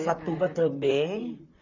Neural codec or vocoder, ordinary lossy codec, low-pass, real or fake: vocoder, 44.1 kHz, 128 mel bands, Pupu-Vocoder; none; 7.2 kHz; fake